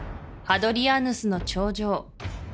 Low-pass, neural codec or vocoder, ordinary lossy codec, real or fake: none; none; none; real